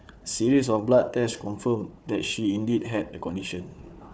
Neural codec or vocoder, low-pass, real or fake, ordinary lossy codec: codec, 16 kHz, 4 kbps, FunCodec, trained on Chinese and English, 50 frames a second; none; fake; none